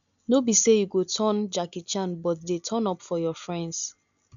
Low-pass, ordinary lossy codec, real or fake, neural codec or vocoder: 7.2 kHz; none; real; none